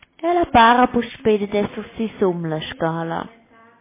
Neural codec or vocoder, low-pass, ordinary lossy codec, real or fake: none; 3.6 kHz; MP3, 16 kbps; real